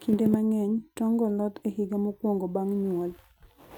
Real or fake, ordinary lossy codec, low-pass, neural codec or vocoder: real; none; 19.8 kHz; none